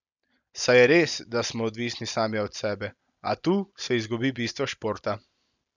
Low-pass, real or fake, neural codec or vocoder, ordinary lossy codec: 7.2 kHz; real; none; none